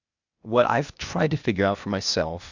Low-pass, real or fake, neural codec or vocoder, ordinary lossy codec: 7.2 kHz; fake; codec, 16 kHz, 0.8 kbps, ZipCodec; Opus, 64 kbps